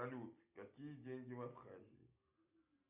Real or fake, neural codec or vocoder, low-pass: real; none; 3.6 kHz